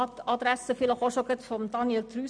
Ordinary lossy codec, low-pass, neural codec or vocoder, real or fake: none; 9.9 kHz; none; real